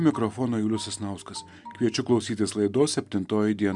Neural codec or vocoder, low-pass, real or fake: none; 10.8 kHz; real